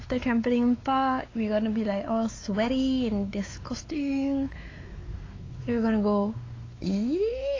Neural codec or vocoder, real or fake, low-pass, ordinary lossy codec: codec, 16 kHz, 4 kbps, X-Codec, WavLM features, trained on Multilingual LibriSpeech; fake; 7.2 kHz; AAC, 32 kbps